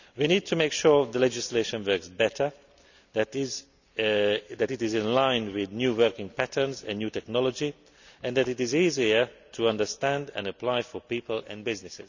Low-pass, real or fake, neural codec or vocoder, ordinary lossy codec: 7.2 kHz; real; none; none